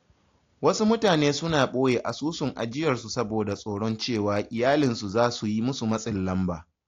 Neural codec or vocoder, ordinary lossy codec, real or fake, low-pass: none; AAC, 48 kbps; real; 7.2 kHz